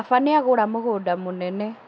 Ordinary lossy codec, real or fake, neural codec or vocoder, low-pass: none; real; none; none